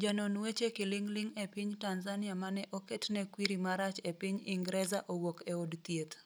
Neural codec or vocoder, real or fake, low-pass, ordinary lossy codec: none; real; none; none